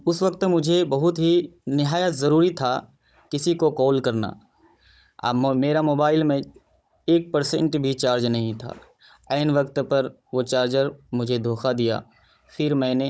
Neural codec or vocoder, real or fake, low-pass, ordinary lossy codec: codec, 16 kHz, 16 kbps, FunCodec, trained on Chinese and English, 50 frames a second; fake; none; none